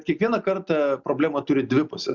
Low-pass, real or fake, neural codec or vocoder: 7.2 kHz; real; none